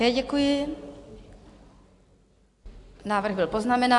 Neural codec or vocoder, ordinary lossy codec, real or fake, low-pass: none; AAC, 64 kbps; real; 10.8 kHz